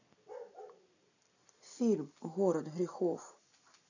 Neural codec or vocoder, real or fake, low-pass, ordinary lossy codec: none; real; 7.2 kHz; none